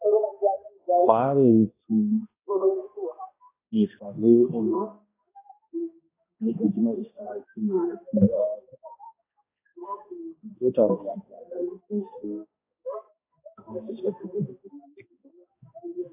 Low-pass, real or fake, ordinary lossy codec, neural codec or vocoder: 3.6 kHz; fake; AAC, 16 kbps; codec, 16 kHz, 1 kbps, X-Codec, HuBERT features, trained on balanced general audio